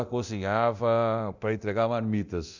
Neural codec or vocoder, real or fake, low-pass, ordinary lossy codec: codec, 24 kHz, 0.9 kbps, DualCodec; fake; 7.2 kHz; none